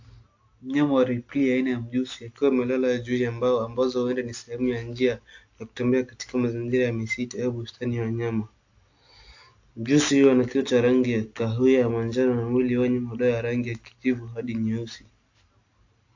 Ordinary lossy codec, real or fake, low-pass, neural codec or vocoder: AAC, 48 kbps; real; 7.2 kHz; none